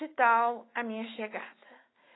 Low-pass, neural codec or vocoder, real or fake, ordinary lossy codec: 7.2 kHz; autoencoder, 48 kHz, 128 numbers a frame, DAC-VAE, trained on Japanese speech; fake; AAC, 16 kbps